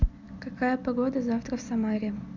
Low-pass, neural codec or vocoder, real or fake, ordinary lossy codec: 7.2 kHz; none; real; none